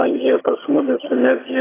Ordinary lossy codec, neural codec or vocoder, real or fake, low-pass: AAC, 16 kbps; vocoder, 22.05 kHz, 80 mel bands, HiFi-GAN; fake; 3.6 kHz